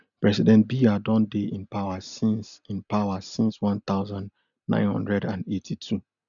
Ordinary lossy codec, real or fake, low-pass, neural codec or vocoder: none; real; 7.2 kHz; none